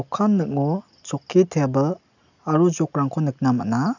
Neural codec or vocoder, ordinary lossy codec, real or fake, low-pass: none; none; real; 7.2 kHz